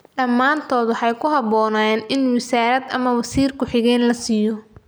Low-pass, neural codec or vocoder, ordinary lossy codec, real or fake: none; none; none; real